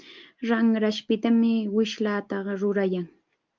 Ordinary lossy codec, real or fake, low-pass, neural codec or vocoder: Opus, 32 kbps; real; 7.2 kHz; none